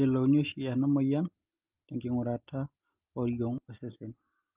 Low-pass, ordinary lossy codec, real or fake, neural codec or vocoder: 3.6 kHz; Opus, 24 kbps; real; none